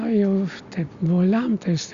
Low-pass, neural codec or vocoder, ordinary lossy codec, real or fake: 7.2 kHz; none; Opus, 64 kbps; real